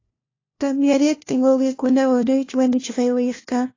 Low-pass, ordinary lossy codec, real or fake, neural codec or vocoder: 7.2 kHz; AAC, 32 kbps; fake; codec, 16 kHz, 1 kbps, FunCodec, trained on LibriTTS, 50 frames a second